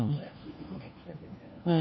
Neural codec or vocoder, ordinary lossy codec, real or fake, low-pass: codec, 16 kHz, 1 kbps, FunCodec, trained on LibriTTS, 50 frames a second; MP3, 24 kbps; fake; 7.2 kHz